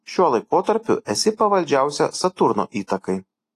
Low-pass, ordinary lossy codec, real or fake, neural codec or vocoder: 14.4 kHz; AAC, 48 kbps; real; none